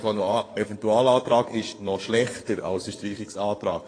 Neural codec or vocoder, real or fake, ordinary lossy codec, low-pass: codec, 16 kHz in and 24 kHz out, 2.2 kbps, FireRedTTS-2 codec; fake; AAC, 32 kbps; 9.9 kHz